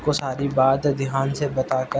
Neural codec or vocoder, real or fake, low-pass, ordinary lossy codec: none; real; none; none